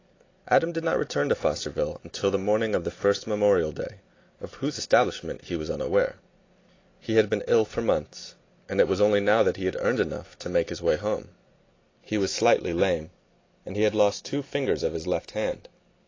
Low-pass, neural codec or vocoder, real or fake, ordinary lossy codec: 7.2 kHz; none; real; AAC, 32 kbps